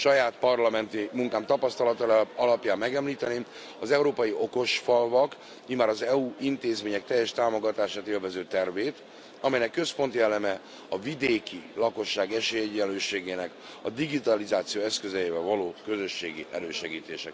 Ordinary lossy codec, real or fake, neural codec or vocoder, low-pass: none; real; none; none